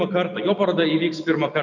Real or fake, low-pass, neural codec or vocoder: real; 7.2 kHz; none